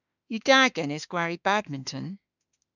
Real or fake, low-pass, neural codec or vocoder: fake; 7.2 kHz; autoencoder, 48 kHz, 32 numbers a frame, DAC-VAE, trained on Japanese speech